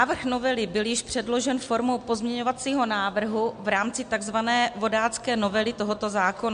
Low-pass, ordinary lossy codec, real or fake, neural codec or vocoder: 9.9 kHz; MP3, 64 kbps; real; none